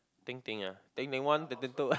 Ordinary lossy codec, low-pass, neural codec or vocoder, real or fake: none; none; none; real